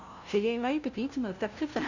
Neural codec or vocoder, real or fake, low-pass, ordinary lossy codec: codec, 16 kHz, 0.5 kbps, FunCodec, trained on LibriTTS, 25 frames a second; fake; 7.2 kHz; none